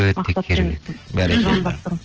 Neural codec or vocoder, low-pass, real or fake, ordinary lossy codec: none; 7.2 kHz; real; Opus, 16 kbps